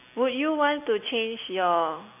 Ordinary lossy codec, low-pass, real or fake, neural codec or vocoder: AAC, 32 kbps; 3.6 kHz; real; none